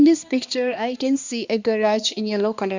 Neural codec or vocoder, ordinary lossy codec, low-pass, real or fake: codec, 16 kHz, 2 kbps, X-Codec, HuBERT features, trained on balanced general audio; Opus, 64 kbps; 7.2 kHz; fake